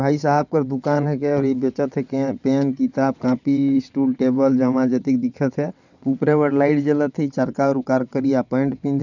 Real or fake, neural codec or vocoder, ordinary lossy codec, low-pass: fake; vocoder, 22.05 kHz, 80 mel bands, WaveNeXt; none; 7.2 kHz